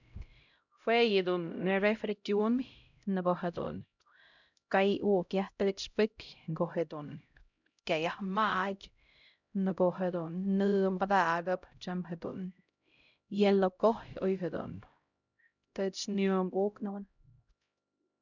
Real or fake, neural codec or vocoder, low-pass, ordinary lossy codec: fake; codec, 16 kHz, 0.5 kbps, X-Codec, HuBERT features, trained on LibriSpeech; 7.2 kHz; none